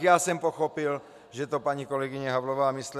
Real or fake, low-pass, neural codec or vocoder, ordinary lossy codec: real; 14.4 kHz; none; MP3, 96 kbps